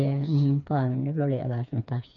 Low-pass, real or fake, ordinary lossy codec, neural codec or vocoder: 7.2 kHz; fake; none; codec, 16 kHz, 4 kbps, FreqCodec, smaller model